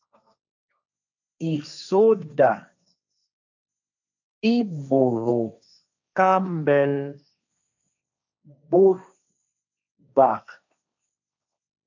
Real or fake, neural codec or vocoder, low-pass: fake; codec, 16 kHz, 1.1 kbps, Voila-Tokenizer; 7.2 kHz